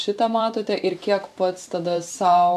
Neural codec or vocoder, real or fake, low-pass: vocoder, 44.1 kHz, 128 mel bands every 512 samples, BigVGAN v2; fake; 14.4 kHz